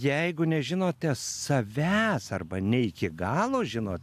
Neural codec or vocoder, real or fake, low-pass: none; real; 14.4 kHz